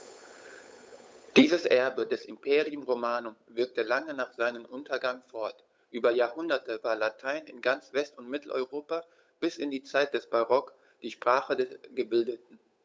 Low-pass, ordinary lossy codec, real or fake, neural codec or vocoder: none; none; fake; codec, 16 kHz, 8 kbps, FunCodec, trained on Chinese and English, 25 frames a second